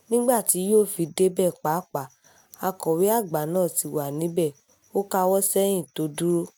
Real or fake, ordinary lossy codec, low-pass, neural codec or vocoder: real; none; none; none